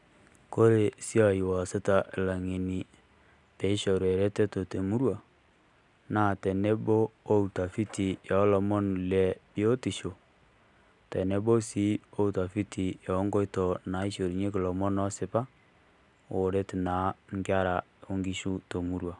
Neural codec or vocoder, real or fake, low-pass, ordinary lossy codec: none; real; 10.8 kHz; none